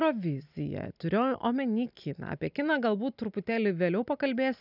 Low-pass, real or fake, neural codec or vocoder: 5.4 kHz; real; none